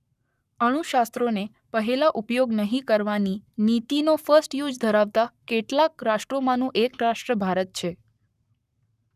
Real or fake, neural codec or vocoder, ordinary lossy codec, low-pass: fake; codec, 44.1 kHz, 7.8 kbps, Pupu-Codec; none; 14.4 kHz